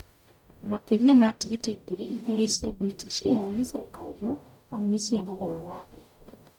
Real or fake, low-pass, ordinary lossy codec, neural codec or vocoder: fake; 19.8 kHz; none; codec, 44.1 kHz, 0.9 kbps, DAC